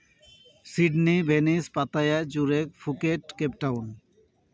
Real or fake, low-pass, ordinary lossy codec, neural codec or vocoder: real; none; none; none